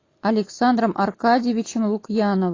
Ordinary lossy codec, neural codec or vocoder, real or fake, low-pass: AAC, 32 kbps; none; real; 7.2 kHz